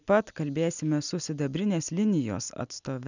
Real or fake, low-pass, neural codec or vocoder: real; 7.2 kHz; none